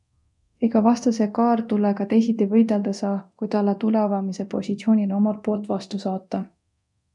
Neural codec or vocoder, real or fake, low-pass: codec, 24 kHz, 0.9 kbps, DualCodec; fake; 10.8 kHz